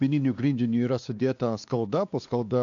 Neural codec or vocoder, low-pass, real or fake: codec, 16 kHz, 2 kbps, X-Codec, WavLM features, trained on Multilingual LibriSpeech; 7.2 kHz; fake